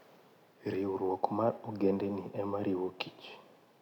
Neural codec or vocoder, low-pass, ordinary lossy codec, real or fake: none; 19.8 kHz; none; real